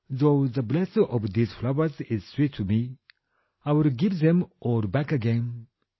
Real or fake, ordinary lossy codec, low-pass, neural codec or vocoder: real; MP3, 24 kbps; 7.2 kHz; none